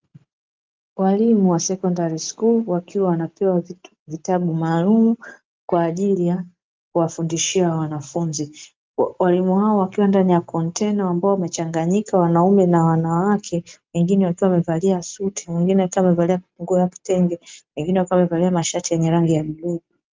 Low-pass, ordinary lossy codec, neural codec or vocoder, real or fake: 7.2 kHz; Opus, 32 kbps; none; real